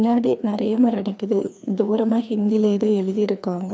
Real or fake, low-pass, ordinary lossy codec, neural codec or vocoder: fake; none; none; codec, 16 kHz, 2 kbps, FreqCodec, larger model